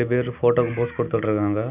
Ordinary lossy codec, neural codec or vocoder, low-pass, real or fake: none; none; 3.6 kHz; real